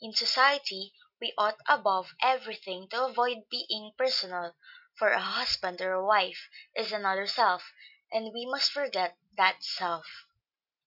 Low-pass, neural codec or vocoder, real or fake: 5.4 kHz; none; real